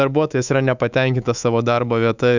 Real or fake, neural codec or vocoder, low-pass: fake; codec, 24 kHz, 3.1 kbps, DualCodec; 7.2 kHz